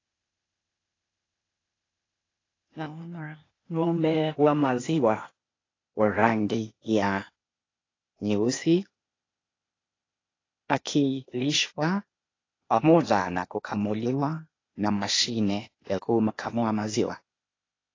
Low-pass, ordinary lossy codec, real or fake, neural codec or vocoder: 7.2 kHz; AAC, 32 kbps; fake; codec, 16 kHz, 0.8 kbps, ZipCodec